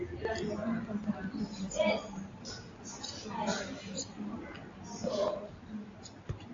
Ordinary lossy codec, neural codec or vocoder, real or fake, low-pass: AAC, 32 kbps; none; real; 7.2 kHz